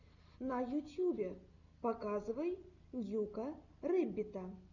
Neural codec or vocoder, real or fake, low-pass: none; real; 7.2 kHz